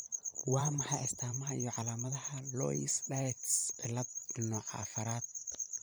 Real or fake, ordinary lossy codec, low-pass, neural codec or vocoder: fake; none; none; vocoder, 44.1 kHz, 128 mel bands every 512 samples, BigVGAN v2